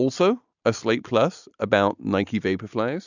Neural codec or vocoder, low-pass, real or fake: none; 7.2 kHz; real